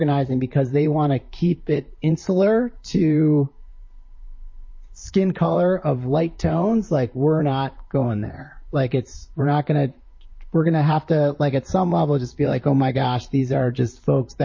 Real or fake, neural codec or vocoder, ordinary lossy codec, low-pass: fake; vocoder, 44.1 kHz, 128 mel bands, Pupu-Vocoder; MP3, 32 kbps; 7.2 kHz